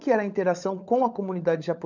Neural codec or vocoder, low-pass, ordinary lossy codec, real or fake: codec, 16 kHz, 16 kbps, FunCodec, trained on LibriTTS, 50 frames a second; 7.2 kHz; none; fake